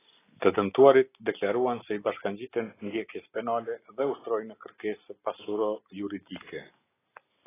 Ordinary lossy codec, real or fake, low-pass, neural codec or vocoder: AAC, 16 kbps; real; 3.6 kHz; none